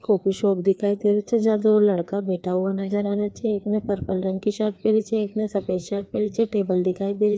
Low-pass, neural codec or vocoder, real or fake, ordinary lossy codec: none; codec, 16 kHz, 2 kbps, FreqCodec, larger model; fake; none